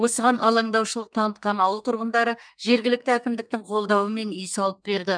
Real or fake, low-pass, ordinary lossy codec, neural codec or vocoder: fake; 9.9 kHz; none; codec, 32 kHz, 1.9 kbps, SNAC